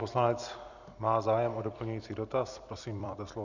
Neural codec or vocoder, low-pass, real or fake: vocoder, 44.1 kHz, 128 mel bands every 256 samples, BigVGAN v2; 7.2 kHz; fake